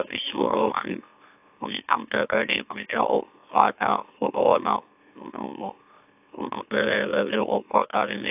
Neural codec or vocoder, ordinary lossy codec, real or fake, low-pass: autoencoder, 44.1 kHz, a latent of 192 numbers a frame, MeloTTS; AAC, 32 kbps; fake; 3.6 kHz